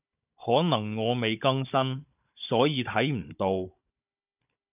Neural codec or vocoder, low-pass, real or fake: codec, 16 kHz, 16 kbps, FunCodec, trained on Chinese and English, 50 frames a second; 3.6 kHz; fake